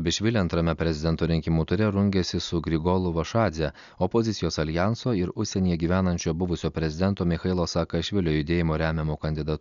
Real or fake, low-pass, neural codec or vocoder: real; 7.2 kHz; none